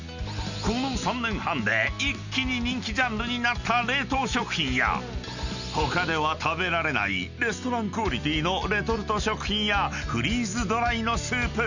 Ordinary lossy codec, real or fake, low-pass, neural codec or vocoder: none; real; 7.2 kHz; none